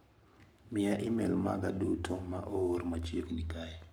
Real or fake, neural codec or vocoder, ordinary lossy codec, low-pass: fake; codec, 44.1 kHz, 7.8 kbps, Pupu-Codec; none; none